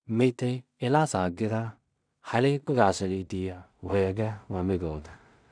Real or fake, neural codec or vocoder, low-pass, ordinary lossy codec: fake; codec, 16 kHz in and 24 kHz out, 0.4 kbps, LongCat-Audio-Codec, two codebook decoder; 9.9 kHz; none